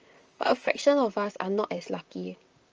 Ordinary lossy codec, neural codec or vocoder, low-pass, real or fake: Opus, 24 kbps; vocoder, 44.1 kHz, 128 mel bands, Pupu-Vocoder; 7.2 kHz; fake